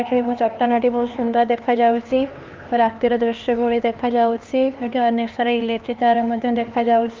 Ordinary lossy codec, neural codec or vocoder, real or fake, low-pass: Opus, 24 kbps; codec, 16 kHz, 2 kbps, X-Codec, HuBERT features, trained on LibriSpeech; fake; 7.2 kHz